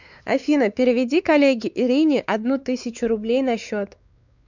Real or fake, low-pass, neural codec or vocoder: fake; 7.2 kHz; codec, 16 kHz, 4 kbps, X-Codec, WavLM features, trained on Multilingual LibriSpeech